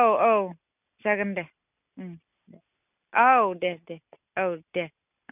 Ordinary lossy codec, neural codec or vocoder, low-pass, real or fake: none; none; 3.6 kHz; real